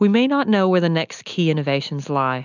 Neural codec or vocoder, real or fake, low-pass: none; real; 7.2 kHz